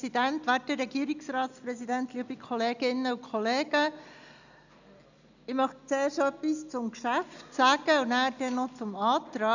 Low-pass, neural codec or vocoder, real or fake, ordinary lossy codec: 7.2 kHz; none; real; none